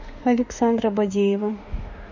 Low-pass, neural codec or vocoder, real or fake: 7.2 kHz; autoencoder, 48 kHz, 32 numbers a frame, DAC-VAE, trained on Japanese speech; fake